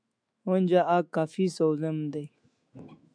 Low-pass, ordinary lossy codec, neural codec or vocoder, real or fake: 9.9 kHz; MP3, 96 kbps; autoencoder, 48 kHz, 128 numbers a frame, DAC-VAE, trained on Japanese speech; fake